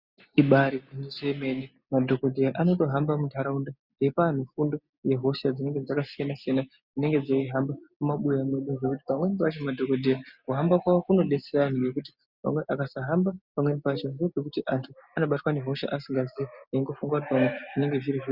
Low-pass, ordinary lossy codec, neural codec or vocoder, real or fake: 5.4 kHz; Opus, 64 kbps; none; real